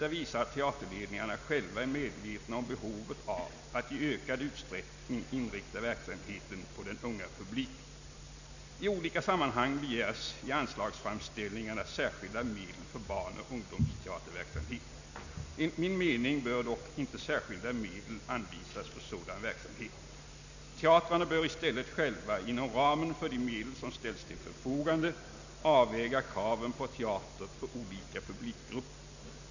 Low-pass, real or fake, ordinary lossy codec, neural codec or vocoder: 7.2 kHz; real; none; none